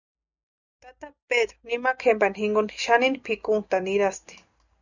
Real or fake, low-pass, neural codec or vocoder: real; 7.2 kHz; none